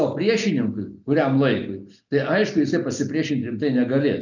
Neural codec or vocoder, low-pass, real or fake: none; 7.2 kHz; real